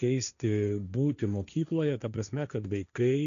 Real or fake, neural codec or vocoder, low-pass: fake; codec, 16 kHz, 1.1 kbps, Voila-Tokenizer; 7.2 kHz